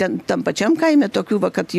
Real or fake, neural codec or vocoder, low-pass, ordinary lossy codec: real; none; 14.4 kHz; AAC, 96 kbps